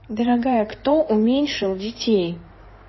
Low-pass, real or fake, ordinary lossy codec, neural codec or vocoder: 7.2 kHz; fake; MP3, 24 kbps; codec, 16 kHz in and 24 kHz out, 2.2 kbps, FireRedTTS-2 codec